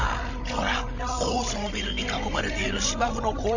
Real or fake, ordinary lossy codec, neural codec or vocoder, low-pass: fake; none; codec, 16 kHz, 16 kbps, FreqCodec, larger model; 7.2 kHz